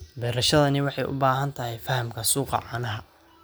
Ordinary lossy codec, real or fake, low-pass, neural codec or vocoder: none; real; none; none